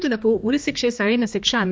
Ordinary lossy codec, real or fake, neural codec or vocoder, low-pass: none; fake; codec, 16 kHz, 2 kbps, X-Codec, HuBERT features, trained on general audio; none